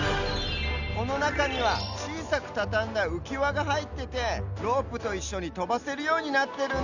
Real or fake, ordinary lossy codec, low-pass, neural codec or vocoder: real; none; 7.2 kHz; none